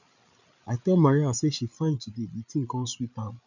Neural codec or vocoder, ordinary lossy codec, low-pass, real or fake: codec, 16 kHz, 8 kbps, FreqCodec, larger model; none; 7.2 kHz; fake